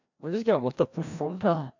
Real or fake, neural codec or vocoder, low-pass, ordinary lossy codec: fake; codec, 16 kHz, 1 kbps, FreqCodec, larger model; 7.2 kHz; none